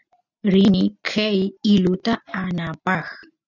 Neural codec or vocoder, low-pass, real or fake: none; 7.2 kHz; real